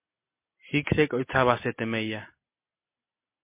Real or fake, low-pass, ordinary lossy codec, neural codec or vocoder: real; 3.6 kHz; MP3, 24 kbps; none